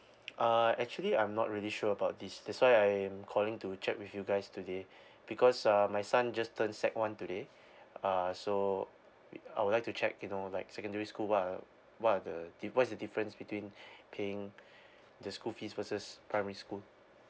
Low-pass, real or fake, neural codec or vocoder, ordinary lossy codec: none; real; none; none